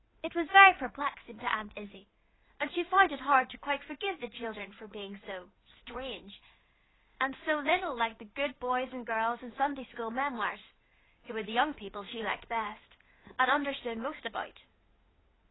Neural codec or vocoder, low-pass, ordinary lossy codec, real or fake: codec, 16 kHz in and 24 kHz out, 2.2 kbps, FireRedTTS-2 codec; 7.2 kHz; AAC, 16 kbps; fake